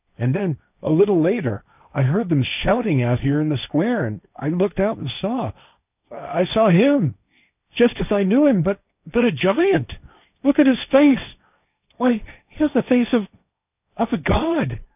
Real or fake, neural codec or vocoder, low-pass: fake; codec, 16 kHz, 1.1 kbps, Voila-Tokenizer; 3.6 kHz